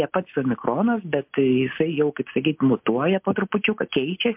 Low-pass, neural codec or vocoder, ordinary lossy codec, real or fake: 3.6 kHz; none; MP3, 32 kbps; real